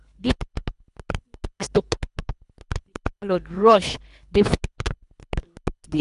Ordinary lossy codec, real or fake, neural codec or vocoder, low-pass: none; fake; codec, 24 kHz, 3 kbps, HILCodec; 10.8 kHz